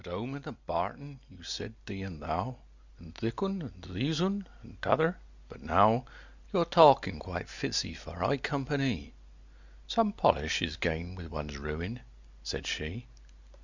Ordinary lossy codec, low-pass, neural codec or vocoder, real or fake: Opus, 64 kbps; 7.2 kHz; none; real